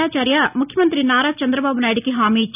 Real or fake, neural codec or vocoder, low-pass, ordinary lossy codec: real; none; 3.6 kHz; none